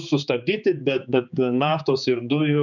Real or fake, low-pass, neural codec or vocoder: fake; 7.2 kHz; codec, 16 kHz, 4 kbps, X-Codec, HuBERT features, trained on general audio